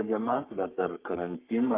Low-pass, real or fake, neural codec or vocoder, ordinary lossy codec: 3.6 kHz; fake; codec, 44.1 kHz, 3.4 kbps, Pupu-Codec; Opus, 24 kbps